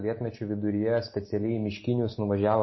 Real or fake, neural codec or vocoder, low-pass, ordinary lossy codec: real; none; 7.2 kHz; MP3, 24 kbps